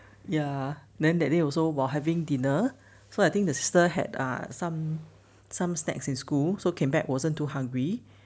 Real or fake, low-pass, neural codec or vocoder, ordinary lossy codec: real; none; none; none